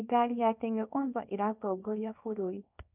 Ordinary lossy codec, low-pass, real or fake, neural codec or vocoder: none; 3.6 kHz; fake; codec, 24 kHz, 0.9 kbps, WavTokenizer, small release